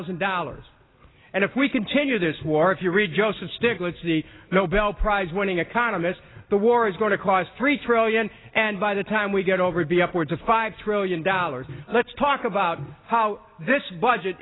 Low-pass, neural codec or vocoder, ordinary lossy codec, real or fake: 7.2 kHz; none; AAC, 16 kbps; real